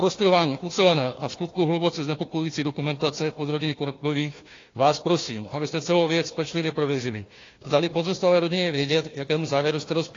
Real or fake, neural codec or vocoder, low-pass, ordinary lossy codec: fake; codec, 16 kHz, 1 kbps, FunCodec, trained on Chinese and English, 50 frames a second; 7.2 kHz; AAC, 32 kbps